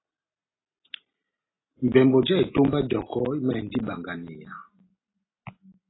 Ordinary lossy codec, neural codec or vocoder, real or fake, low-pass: AAC, 16 kbps; none; real; 7.2 kHz